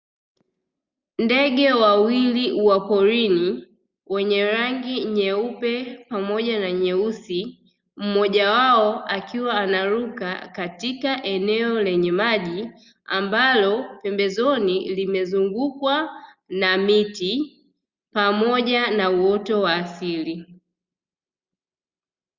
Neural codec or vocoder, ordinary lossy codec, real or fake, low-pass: none; Opus, 64 kbps; real; 7.2 kHz